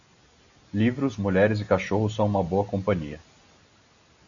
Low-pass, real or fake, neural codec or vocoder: 7.2 kHz; real; none